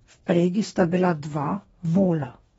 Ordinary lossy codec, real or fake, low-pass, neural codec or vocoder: AAC, 24 kbps; fake; 19.8 kHz; codec, 44.1 kHz, 2.6 kbps, DAC